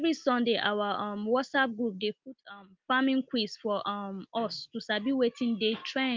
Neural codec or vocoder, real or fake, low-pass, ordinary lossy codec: none; real; 7.2 kHz; Opus, 32 kbps